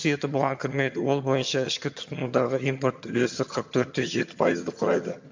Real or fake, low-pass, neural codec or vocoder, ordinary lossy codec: fake; 7.2 kHz; vocoder, 22.05 kHz, 80 mel bands, HiFi-GAN; MP3, 48 kbps